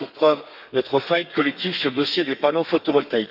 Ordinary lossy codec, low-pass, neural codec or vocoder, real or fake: none; 5.4 kHz; codec, 32 kHz, 1.9 kbps, SNAC; fake